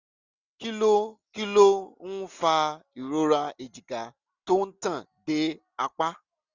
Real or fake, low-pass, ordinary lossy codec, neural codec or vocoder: real; 7.2 kHz; none; none